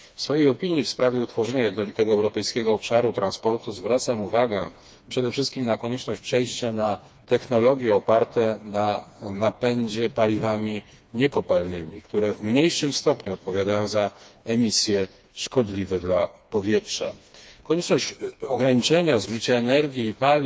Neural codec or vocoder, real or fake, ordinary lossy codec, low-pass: codec, 16 kHz, 2 kbps, FreqCodec, smaller model; fake; none; none